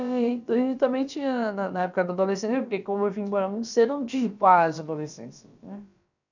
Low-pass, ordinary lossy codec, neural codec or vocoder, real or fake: 7.2 kHz; none; codec, 16 kHz, about 1 kbps, DyCAST, with the encoder's durations; fake